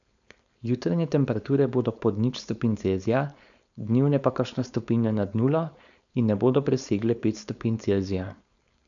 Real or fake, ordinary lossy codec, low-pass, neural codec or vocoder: fake; none; 7.2 kHz; codec, 16 kHz, 4.8 kbps, FACodec